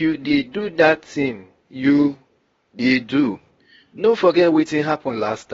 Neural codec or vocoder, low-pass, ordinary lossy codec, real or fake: codec, 16 kHz, 0.8 kbps, ZipCodec; 7.2 kHz; AAC, 24 kbps; fake